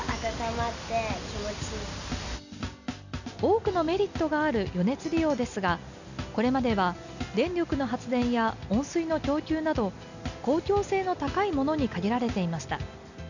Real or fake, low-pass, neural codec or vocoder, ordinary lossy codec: real; 7.2 kHz; none; none